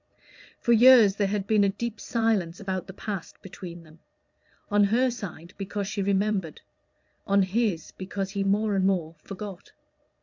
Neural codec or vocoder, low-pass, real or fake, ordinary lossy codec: vocoder, 22.05 kHz, 80 mel bands, Vocos; 7.2 kHz; fake; MP3, 64 kbps